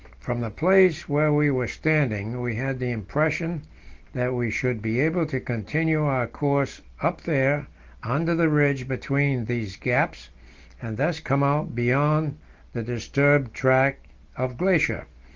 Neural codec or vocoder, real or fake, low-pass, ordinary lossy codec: none; real; 7.2 kHz; Opus, 16 kbps